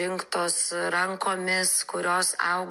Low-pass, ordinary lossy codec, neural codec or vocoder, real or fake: 14.4 kHz; MP3, 64 kbps; none; real